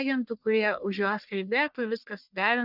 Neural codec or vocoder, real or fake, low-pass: codec, 44.1 kHz, 1.7 kbps, Pupu-Codec; fake; 5.4 kHz